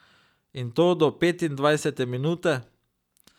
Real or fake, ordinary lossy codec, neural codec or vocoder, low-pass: real; none; none; 19.8 kHz